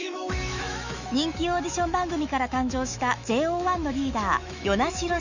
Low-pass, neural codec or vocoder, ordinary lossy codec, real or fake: 7.2 kHz; autoencoder, 48 kHz, 128 numbers a frame, DAC-VAE, trained on Japanese speech; none; fake